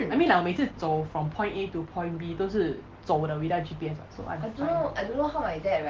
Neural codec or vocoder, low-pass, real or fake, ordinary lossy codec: none; 7.2 kHz; real; Opus, 16 kbps